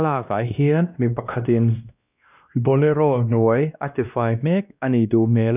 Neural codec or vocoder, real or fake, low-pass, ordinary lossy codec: codec, 16 kHz, 1 kbps, X-Codec, WavLM features, trained on Multilingual LibriSpeech; fake; 3.6 kHz; none